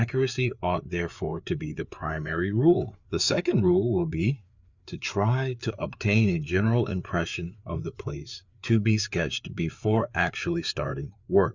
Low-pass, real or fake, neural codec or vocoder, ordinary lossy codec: 7.2 kHz; fake; codec, 16 kHz, 4 kbps, FreqCodec, larger model; Opus, 64 kbps